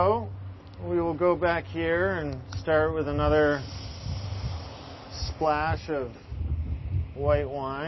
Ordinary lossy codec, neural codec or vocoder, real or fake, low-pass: MP3, 24 kbps; none; real; 7.2 kHz